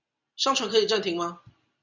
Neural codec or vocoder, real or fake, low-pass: none; real; 7.2 kHz